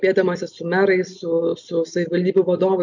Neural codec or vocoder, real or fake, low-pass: none; real; 7.2 kHz